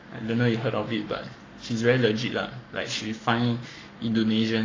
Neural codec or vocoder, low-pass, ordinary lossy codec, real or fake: codec, 44.1 kHz, 7.8 kbps, Pupu-Codec; 7.2 kHz; AAC, 32 kbps; fake